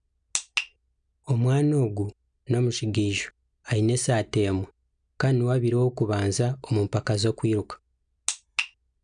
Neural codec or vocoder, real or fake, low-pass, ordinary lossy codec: none; real; 9.9 kHz; none